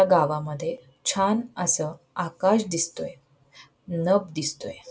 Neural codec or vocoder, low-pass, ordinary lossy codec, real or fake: none; none; none; real